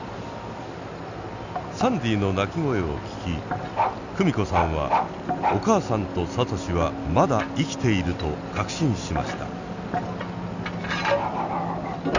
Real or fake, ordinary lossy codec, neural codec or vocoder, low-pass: real; none; none; 7.2 kHz